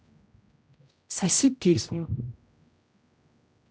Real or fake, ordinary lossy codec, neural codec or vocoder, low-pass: fake; none; codec, 16 kHz, 0.5 kbps, X-Codec, HuBERT features, trained on general audio; none